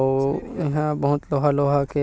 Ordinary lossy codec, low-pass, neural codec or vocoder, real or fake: none; none; none; real